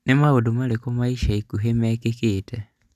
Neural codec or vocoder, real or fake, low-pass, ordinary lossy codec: none; real; 14.4 kHz; none